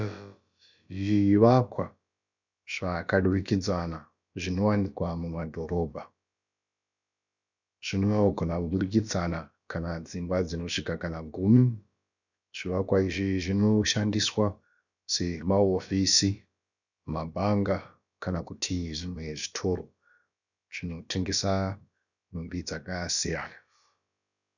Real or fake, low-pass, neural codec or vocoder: fake; 7.2 kHz; codec, 16 kHz, about 1 kbps, DyCAST, with the encoder's durations